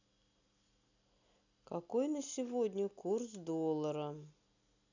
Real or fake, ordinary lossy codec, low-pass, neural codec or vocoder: real; none; 7.2 kHz; none